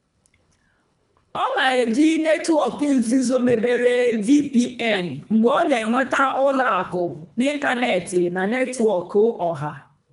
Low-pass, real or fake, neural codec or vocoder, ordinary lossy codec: 10.8 kHz; fake; codec, 24 kHz, 1.5 kbps, HILCodec; none